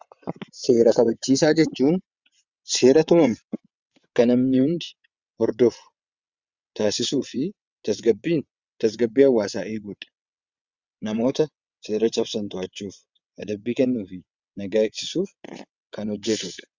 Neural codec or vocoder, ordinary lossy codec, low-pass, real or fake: vocoder, 22.05 kHz, 80 mel bands, WaveNeXt; Opus, 64 kbps; 7.2 kHz; fake